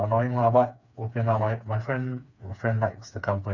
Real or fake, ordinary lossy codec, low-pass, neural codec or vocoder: fake; none; 7.2 kHz; codec, 16 kHz, 4 kbps, FreqCodec, smaller model